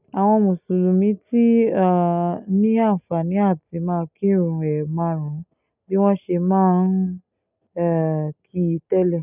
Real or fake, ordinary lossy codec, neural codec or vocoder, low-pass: real; none; none; 3.6 kHz